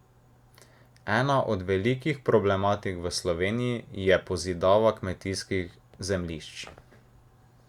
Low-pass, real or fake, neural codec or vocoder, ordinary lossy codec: 19.8 kHz; real; none; Opus, 64 kbps